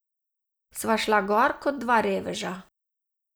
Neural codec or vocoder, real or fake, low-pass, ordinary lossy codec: none; real; none; none